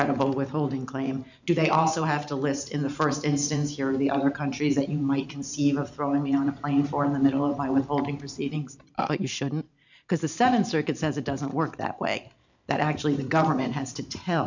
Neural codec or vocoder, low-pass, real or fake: vocoder, 44.1 kHz, 128 mel bands every 512 samples, BigVGAN v2; 7.2 kHz; fake